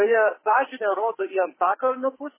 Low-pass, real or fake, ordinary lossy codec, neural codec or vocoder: 3.6 kHz; fake; MP3, 16 kbps; codec, 44.1 kHz, 2.6 kbps, SNAC